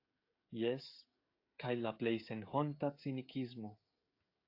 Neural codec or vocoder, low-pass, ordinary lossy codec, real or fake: codec, 16 kHz, 16 kbps, FreqCodec, smaller model; 5.4 kHz; AAC, 32 kbps; fake